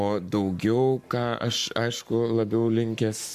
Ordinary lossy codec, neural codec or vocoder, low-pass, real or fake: MP3, 96 kbps; codec, 44.1 kHz, 7.8 kbps, Pupu-Codec; 14.4 kHz; fake